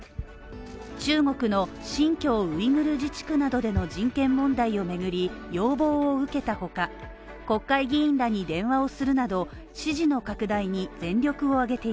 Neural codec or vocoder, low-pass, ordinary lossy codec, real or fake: none; none; none; real